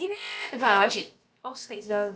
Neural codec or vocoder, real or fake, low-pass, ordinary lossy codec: codec, 16 kHz, about 1 kbps, DyCAST, with the encoder's durations; fake; none; none